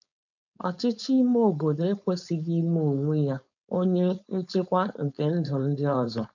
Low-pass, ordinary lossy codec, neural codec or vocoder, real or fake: 7.2 kHz; none; codec, 16 kHz, 4.8 kbps, FACodec; fake